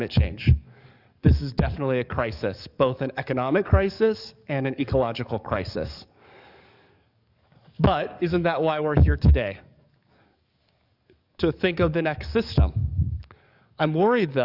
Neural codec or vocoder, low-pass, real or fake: codec, 44.1 kHz, 7.8 kbps, DAC; 5.4 kHz; fake